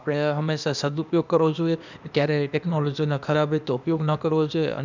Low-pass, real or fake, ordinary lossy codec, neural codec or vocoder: 7.2 kHz; fake; none; codec, 16 kHz, 0.8 kbps, ZipCodec